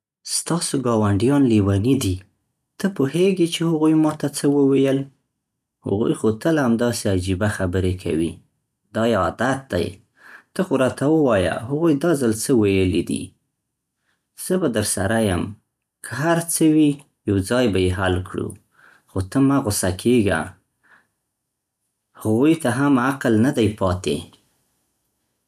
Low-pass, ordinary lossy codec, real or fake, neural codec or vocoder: 14.4 kHz; none; real; none